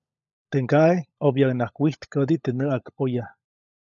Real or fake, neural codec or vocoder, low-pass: fake; codec, 16 kHz, 16 kbps, FunCodec, trained on LibriTTS, 50 frames a second; 7.2 kHz